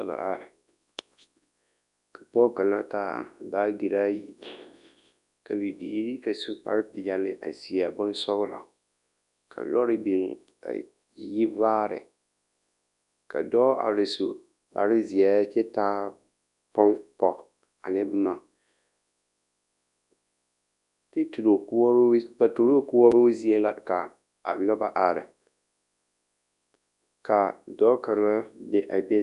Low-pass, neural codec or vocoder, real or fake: 10.8 kHz; codec, 24 kHz, 0.9 kbps, WavTokenizer, large speech release; fake